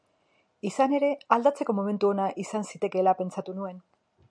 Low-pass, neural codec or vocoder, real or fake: 9.9 kHz; none; real